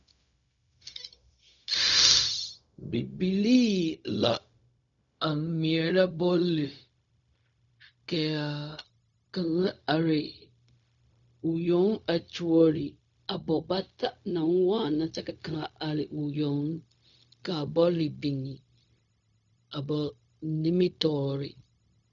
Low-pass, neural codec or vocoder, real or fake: 7.2 kHz; codec, 16 kHz, 0.4 kbps, LongCat-Audio-Codec; fake